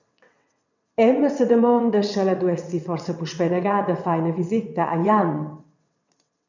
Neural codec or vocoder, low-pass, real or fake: vocoder, 22.05 kHz, 80 mel bands, WaveNeXt; 7.2 kHz; fake